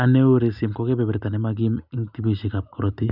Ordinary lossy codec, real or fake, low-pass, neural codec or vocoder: none; real; 5.4 kHz; none